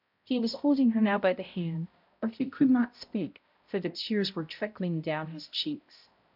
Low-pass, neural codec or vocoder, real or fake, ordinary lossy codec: 5.4 kHz; codec, 16 kHz, 0.5 kbps, X-Codec, HuBERT features, trained on balanced general audio; fake; MP3, 48 kbps